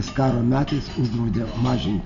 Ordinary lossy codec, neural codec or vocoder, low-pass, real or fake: Opus, 64 kbps; none; 7.2 kHz; real